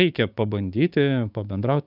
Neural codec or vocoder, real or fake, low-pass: codec, 16 kHz, 6 kbps, DAC; fake; 5.4 kHz